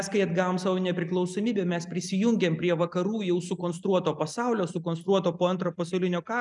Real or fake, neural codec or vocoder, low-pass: real; none; 10.8 kHz